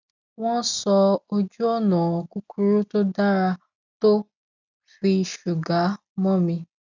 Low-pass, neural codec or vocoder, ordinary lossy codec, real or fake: 7.2 kHz; none; none; real